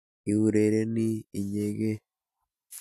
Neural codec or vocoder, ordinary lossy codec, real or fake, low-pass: none; MP3, 96 kbps; real; 14.4 kHz